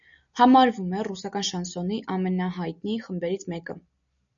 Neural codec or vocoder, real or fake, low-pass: none; real; 7.2 kHz